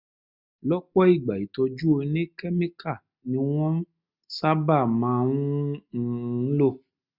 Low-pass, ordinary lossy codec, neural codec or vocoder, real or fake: 5.4 kHz; none; none; real